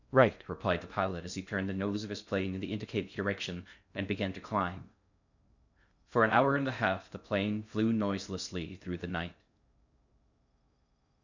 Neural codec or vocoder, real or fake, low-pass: codec, 16 kHz in and 24 kHz out, 0.6 kbps, FocalCodec, streaming, 2048 codes; fake; 7.2 kHz